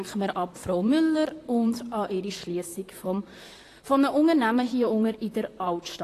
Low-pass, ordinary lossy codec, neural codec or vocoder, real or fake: 14.4 kHz; AAC, 48 kbps; vocoder, 44.1 kHz, 128 mel bands, Pupu-Vocoder; fake